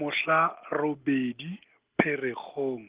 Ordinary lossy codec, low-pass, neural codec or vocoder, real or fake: Opus, 16 kbps; 3.6 kHz; none; real